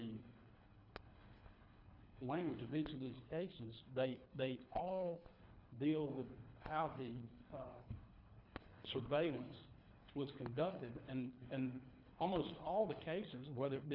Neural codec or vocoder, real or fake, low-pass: codec, 24 kHz, 3 kbps, HILCodec; fake; 5.4 kHz